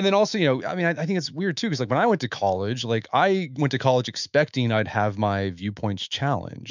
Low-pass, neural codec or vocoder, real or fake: 7.2 kHz; autoencoder, 48 kHz, 128 numbers a frame, DAC-VAE, trained on Japanese speech; fake